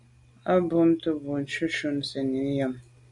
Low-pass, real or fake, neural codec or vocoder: 10.8 kHz; real; none